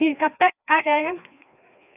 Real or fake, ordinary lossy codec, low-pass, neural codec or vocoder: fake; none; 3.6 kHz; codec, 16 kHz, 2 kbps, FreqCodec, larger model